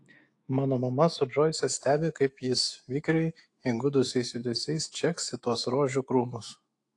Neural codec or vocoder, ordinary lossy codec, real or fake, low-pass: autoencoder, 48 kHz, 128 numbers a frame, DAC-VAE, trained on Japanese speech; AAC, 48 kbps; fake; 10.8 kHz